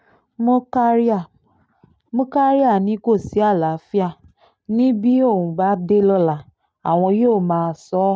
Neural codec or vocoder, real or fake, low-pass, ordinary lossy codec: none; real; none; none